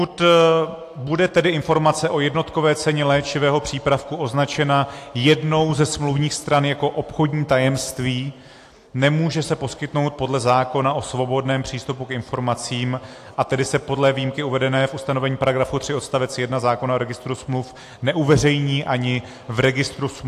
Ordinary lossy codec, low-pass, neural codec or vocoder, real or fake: AAC, 64 kbps; 14.4 kHz; none; real